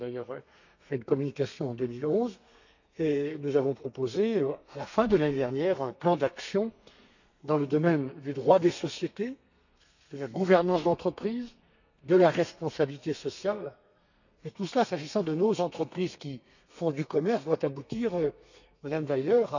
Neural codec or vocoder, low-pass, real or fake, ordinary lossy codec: codec, 32 kHz, 1.9 kbps, SNAC; 7.2 kHz; fake; none